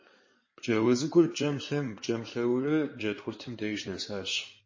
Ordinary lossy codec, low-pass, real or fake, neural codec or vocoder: MP3, 32 kbps; 7.2 kHz; fake; codec, 16 kHz in and 24 kHz out, 2.2 kbps, FireRedTTS-2 codec